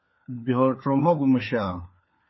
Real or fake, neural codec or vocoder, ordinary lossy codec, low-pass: fake; codec, 16 kHz, 4 kbps, FunCodec, trained on LibriTTS, 50 frames a second; MP3, 24 kbps; 7.2 kHz